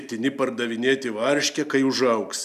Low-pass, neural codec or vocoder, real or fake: 14.4 kHz; none; real